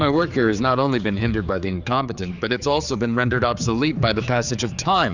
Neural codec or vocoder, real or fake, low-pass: codec, 16 kHz, 4 kbps, X-Codec, HuBERT features, trained on general audio; fake; 7.2 kHz